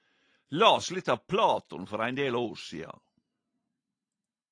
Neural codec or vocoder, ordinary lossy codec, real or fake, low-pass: none; AAC, 48 kbps; real; 9.9 kHz